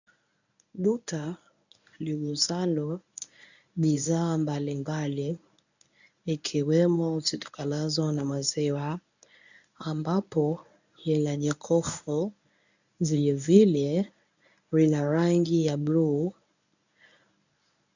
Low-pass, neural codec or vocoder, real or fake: 7.2 kHz; codec, 24 kHz, 0.9 kbps, WavTokenizer, medium speech release version 1; fake